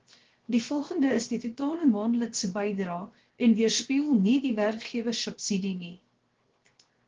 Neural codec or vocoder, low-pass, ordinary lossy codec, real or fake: codec, 16 kHz, 0.7 kbps, FocalCodec; 7.2 kHz; Opus, 16 kbps; fake